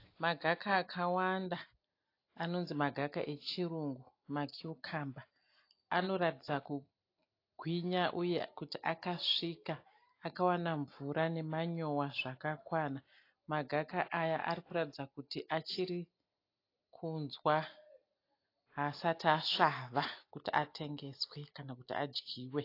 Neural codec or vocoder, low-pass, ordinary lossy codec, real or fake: none; 5.4 kHz; AAC, 32 kbps; real